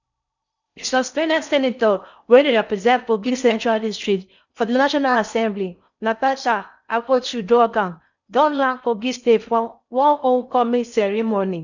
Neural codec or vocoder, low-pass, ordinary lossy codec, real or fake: codec, 16 kHz in and 24 kHz out, 0.6 kbps, FocalCodec, streaming, 4096 codes; 7.2 kHz; none; fake